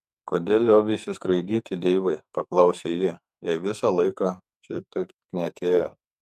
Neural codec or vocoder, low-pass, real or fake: codec, 44.1 kHz, 2.6 kbps, SNAC; 14.4 kHz; fake